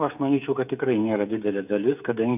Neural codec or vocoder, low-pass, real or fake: codec, 16 kHz, 8 kbps, FreqCodec, smaller model; 3.6 kHz; fake